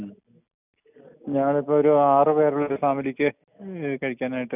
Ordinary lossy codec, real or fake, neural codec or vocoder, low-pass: none; real; none; 3.6 kHz